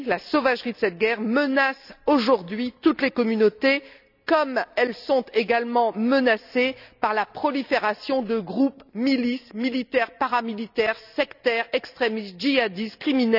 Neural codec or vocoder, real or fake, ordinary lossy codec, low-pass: none; real; none; 5.4 kHz